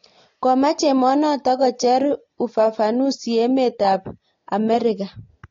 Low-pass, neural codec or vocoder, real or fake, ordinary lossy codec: 7.2 kHz; none; real; AAC, 32 kbps